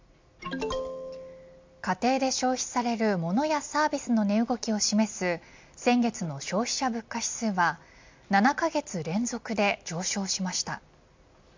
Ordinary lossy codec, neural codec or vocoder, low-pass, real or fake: MP3, 64 kbps; none; 7.2 kHz; real